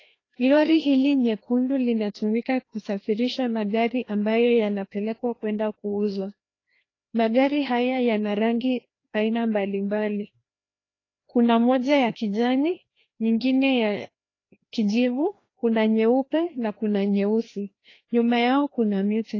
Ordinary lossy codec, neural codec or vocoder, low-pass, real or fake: AAC, 32 kbps; codec, 16 kHz, 1 kbps, FreqCodec, larger model; 7.2 kHz; fake